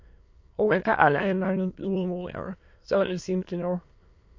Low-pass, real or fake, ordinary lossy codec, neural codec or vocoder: 7.2 kHz; fake; MP3, 48 kbps; autoencoder, 22.05 kHz, a latent of 192 numbers a frame, VITS, trained on many speakers